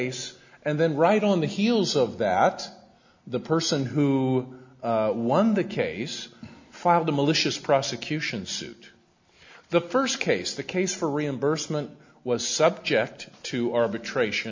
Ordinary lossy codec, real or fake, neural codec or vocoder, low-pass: MP3, 64 kbps; real; none; 7.2 kHz